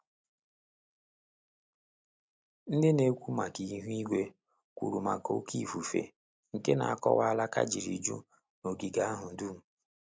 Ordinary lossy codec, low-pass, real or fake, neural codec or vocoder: none; none; real; none